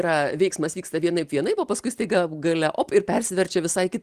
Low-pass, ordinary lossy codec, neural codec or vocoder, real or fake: 14.4 kHz; Opus, 24 kbps; none; real